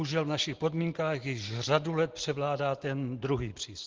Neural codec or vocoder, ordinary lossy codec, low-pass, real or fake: none; Opus, 16 kbps; 7.2 kHz; real